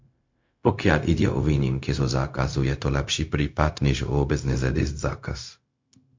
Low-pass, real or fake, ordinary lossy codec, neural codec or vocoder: 7.2 kHz; fake; MP3, 48 kbps; codec, 16 kHz, 0.4 kbps, LongCat-Audio-Codec